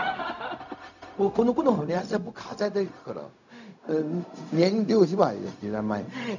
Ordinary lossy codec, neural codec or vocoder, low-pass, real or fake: none; codec, 16 kHz, 0.4 kbps, LongCat-Audio-Codec; 7.2 kHz; fake